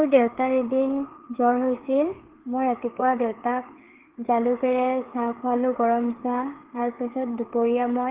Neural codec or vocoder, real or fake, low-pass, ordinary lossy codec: codec, 16 kHz, 4 kbps, FreqCodec, larger model; fake; 3.6 kHz; Opus, 16 kbps